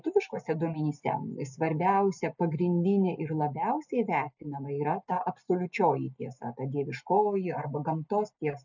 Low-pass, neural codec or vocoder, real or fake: 7.2 kHz; none; real